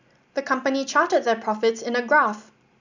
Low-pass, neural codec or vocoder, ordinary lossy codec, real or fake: 7.2 kHz; none; none; real